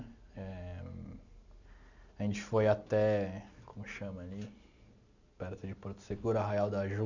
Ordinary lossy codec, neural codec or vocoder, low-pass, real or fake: none; none; 7.2 kHz; real